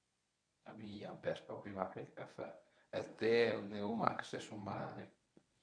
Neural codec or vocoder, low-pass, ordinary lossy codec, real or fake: codec, 24 kHz, 0.9 kbps, WavTokenizer, medium speech release version 1; 9.9 kHz; none; fake